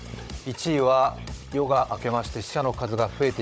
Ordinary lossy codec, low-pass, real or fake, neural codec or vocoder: none; none; fake; codec, 16 kHz, 16 kbps, FunCodec, trained on Chinese and English, 50 frames a second